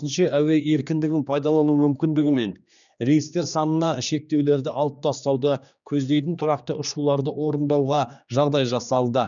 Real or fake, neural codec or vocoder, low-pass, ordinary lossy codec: fake; codec, 16 kHz, 2 kbps, X-Codec, HuBERT features, trained on general audio; 7.2 kHz; none